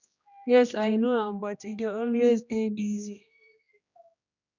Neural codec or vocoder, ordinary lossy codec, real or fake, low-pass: codec, 16 kHz, 1 kbps, X-Codec, HuBERT features, trained on general audio; none; fake; 7.2 kHz